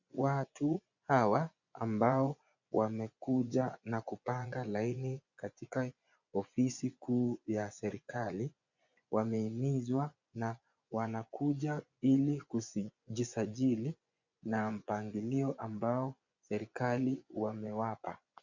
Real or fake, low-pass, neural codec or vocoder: fake; 7.2 kHz; vocoder, 24 kHz, 100 mel bands, Vocos